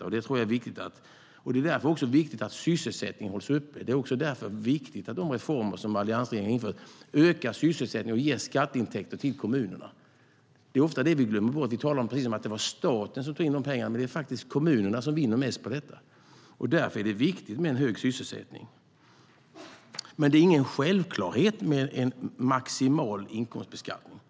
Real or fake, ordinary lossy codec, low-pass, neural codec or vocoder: real; none; none; none